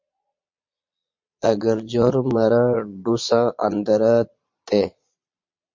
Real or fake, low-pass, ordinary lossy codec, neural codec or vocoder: fake; 7.2 kHz; MP3, 48 kbps; vocoder, 22.05 kHz, 80 mel bands, Vocos